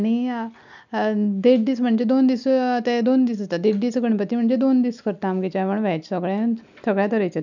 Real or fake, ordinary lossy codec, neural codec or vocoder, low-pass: real; none; none; 7.2 kHz